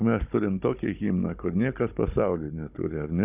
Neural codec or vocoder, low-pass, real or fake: codec, 16 kHz, 16 kbps, FunCodec, trained on Chinese and English, 50 frames a second; 3.6 kHz; fake